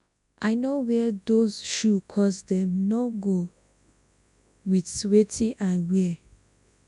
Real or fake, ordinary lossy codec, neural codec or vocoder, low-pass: fake; MP3, 96 kbps; codec, 24 kHz, 0.9 kbps, WavTokenizer, large speech release; 10.8 kHz